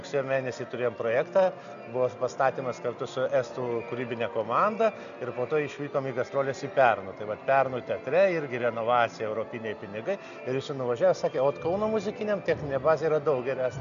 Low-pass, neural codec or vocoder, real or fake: 7.2 kHz; none; real